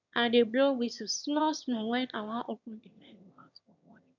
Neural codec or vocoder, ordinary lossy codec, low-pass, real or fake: autoencoder, 22.05 kHz, a latent of 192 numbers a frame, VITS, trained on one speaker; none; 7.2 kHz; fake